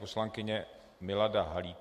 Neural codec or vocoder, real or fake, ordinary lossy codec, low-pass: none; real; MP3, 64 kbps; 14.4 kHz